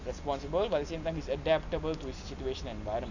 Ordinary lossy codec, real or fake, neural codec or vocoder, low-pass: none; real; none; 7.2 kHz